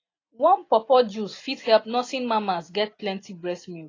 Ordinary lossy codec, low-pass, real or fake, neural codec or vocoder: AAC, 32 kbps; 7.2 kHz; real; none